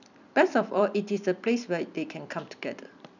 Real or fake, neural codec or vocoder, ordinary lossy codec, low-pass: fake; vocoder, 44.1 kHz, 128 mel bands every 256 samples, BigVGAN v2; none; 7.2 kHz